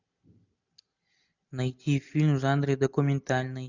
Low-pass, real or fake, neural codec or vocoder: 7.2 kHz; real; none